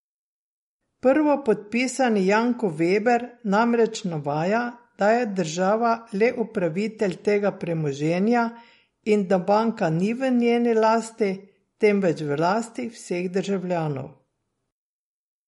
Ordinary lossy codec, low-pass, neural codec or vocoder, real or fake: MP3, 48 kbps; 19.8 kHz; none; real